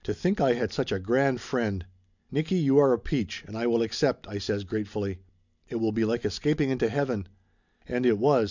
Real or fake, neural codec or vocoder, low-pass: real; none; 7.2 kHz